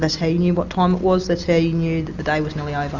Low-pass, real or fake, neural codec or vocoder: 7.2 kHz; real; none